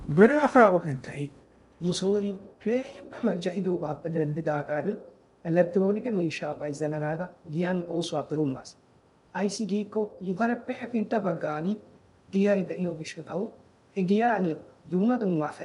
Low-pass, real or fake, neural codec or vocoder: 10.8 kHz; fake; codec, 16 kHz in and 24 kHz out, 0.6 kbps, FocalCodec, streaming, 2048 codes